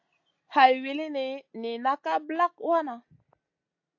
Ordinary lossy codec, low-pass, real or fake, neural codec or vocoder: AAC, 48 kbps; 7.2 kHz; real; none